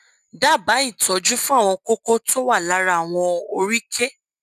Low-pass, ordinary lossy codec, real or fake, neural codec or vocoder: 14.4 kHz; none; real; none